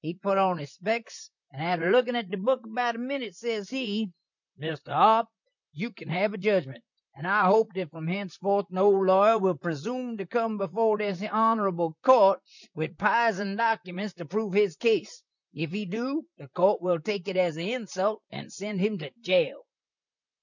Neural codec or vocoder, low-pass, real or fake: vocoder, 44.1 kHz, 128 mel bands, Pupu-Vocoder; 7.2 kHz; fake